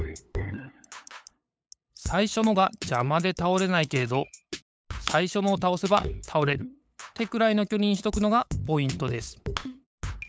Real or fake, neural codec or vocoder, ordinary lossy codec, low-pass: fake; codec, 16 kHz, 8 kbps, FunCodec, trained on LibriTTS, 25 frames a second; none; none